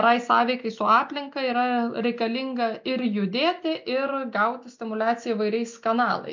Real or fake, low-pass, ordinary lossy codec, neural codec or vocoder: real; 7.2 kHz; MP3, 64 kbps; none